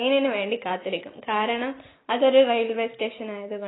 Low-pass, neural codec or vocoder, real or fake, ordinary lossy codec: 7.2 kHz; none; real; AAC, 16 kbps